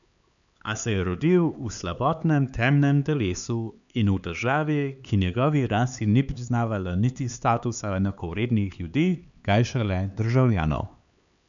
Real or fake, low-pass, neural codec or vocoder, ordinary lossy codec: fake; 7.2 kHz; codec, 16 kHz, 4 kbps, X-Codec, HuBERT features, trained on LibriSpeech; none